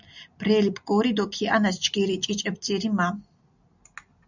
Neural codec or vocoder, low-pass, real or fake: none; 7.2 kHz; real